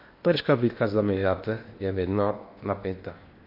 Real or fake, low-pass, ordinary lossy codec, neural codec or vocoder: fake; 5.4 kHz; MP3, 32 kbps; codec, 16 kHz in and 24 kHz out, 0.8 kbps, FocalCodec, streaming, 65536 codes